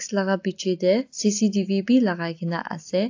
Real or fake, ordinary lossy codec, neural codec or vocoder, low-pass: real; AAC, 48 kbps; none; 7.2 kHz